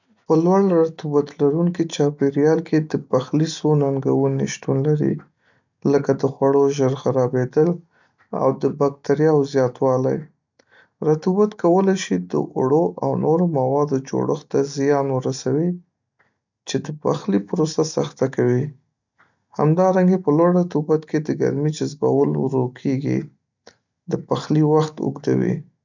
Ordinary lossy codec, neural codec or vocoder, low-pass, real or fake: none; none; 7.2 kHz; real